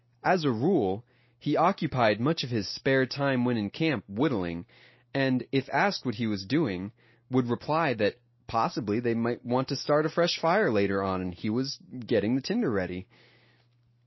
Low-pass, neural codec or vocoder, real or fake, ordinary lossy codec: 7.2 kHz; none; real; MP3, 24 kbps